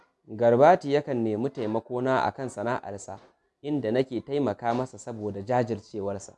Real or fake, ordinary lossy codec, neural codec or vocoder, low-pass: real; none; none; none